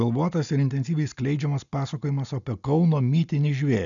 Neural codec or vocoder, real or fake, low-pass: none; real; 7.2 kHz